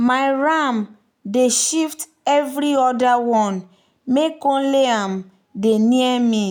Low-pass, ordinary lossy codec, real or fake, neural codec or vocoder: none; none; real; none